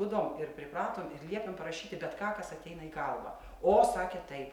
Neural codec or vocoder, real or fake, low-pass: none; real; 19.8 kHz